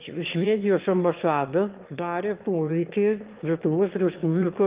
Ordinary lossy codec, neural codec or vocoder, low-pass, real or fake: Opus, 32 kbps; autoencoder, 22.05 kHz, a latent of 192 numbers a frame, VITS, trained on one speaker; 3.6 kHz; fake